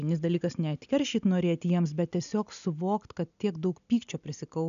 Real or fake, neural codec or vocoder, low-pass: real; none; 7.2 kHz